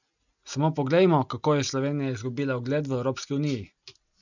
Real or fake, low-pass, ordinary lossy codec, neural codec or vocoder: real; 7.2 kHz; none; none